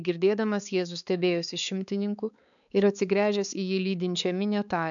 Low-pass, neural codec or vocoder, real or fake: 7.2 kHz; codec, 16 kHz, 4 kbps, X-Codec, HuBERT features, trained on balanced general audio; fake